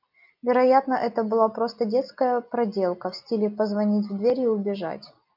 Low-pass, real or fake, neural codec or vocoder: 5.4 kHz; real; none